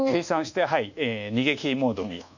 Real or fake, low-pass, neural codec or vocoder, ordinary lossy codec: fake; 7.2 kHz; codec, 24 kHz, 1.2 kbps, DualCodec; none